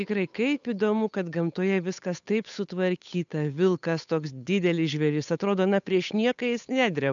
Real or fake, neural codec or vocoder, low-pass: fake; codec, 16 kHz, 8 kbps, FunCodec, trained on Chinese and English, 25 frames a second; 7.2 kHz